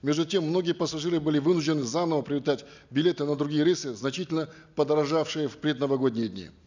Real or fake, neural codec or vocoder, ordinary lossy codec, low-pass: real; none; none; 7.2 kHz